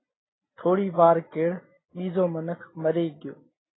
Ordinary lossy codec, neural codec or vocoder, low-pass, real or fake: AAC, 16 kbps; none; 7.2 kHz; real